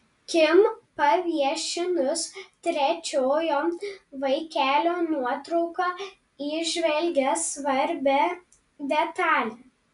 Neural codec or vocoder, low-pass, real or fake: none; 10.8 kHz; real